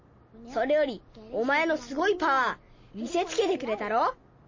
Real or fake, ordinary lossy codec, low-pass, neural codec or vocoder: real; MP3, 32 kbps; 7.2 kHz; none